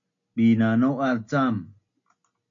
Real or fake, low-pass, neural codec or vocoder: real; 7.2 kHz; none